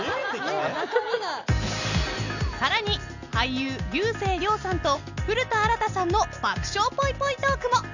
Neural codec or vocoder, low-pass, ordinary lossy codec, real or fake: none; 7.2 kHz; none; real